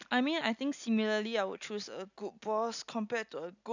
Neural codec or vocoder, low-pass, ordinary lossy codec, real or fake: none; 7.2 kHz; none; real